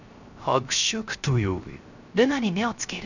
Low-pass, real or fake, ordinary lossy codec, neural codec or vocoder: 7.2 kHz; fake; none; codec, 16 kHz, 0.3 kbps, FocalCodec